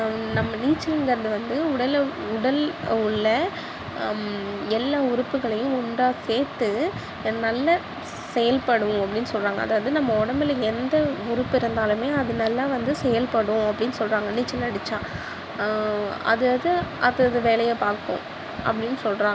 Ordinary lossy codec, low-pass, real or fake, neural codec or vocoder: none; none; real; none